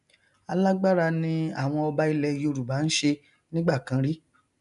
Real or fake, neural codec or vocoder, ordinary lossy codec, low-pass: real; none; none; 10.8 kHz